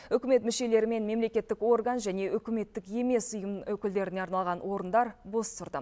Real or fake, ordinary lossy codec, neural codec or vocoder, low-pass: real; none; none; none